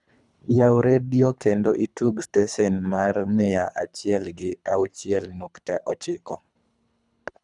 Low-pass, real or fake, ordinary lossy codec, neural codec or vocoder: 10.8 kHz; fake; none; codec, 24 kHz, 3 kbps, HILCodec